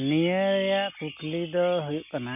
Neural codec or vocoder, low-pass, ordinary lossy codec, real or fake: none; 3.6 kHz; none; real